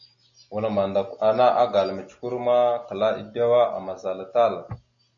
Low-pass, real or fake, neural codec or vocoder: 7.2 kHz; real; none